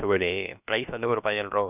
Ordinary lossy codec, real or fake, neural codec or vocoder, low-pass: none; fake; codec, 16 kHz, about 1 kbps, DyCAST, with the encoder's durations; 3.6 kHz